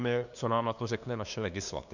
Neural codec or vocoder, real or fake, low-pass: codec, 16 kHz, 2 kbps, FunCodec, trained on LibriTTS, 25 frames a second; fake; 7.2 kHz